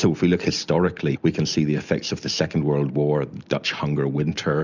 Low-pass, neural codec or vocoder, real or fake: 7.2 kHz; none; real